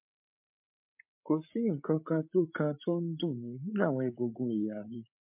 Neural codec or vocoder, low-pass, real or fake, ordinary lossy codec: codec, 16 kHz, 8 kbps, FreqCodec, larger model; 3.6 kHz; fake; MP3, 32 kbps